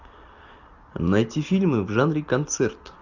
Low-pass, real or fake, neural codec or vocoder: 7.2 kHz; real; none